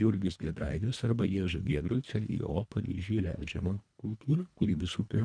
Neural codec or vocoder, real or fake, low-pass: codec, 24 kHz, 1.5 kbps, HILCodec; fake; 9.9 kHz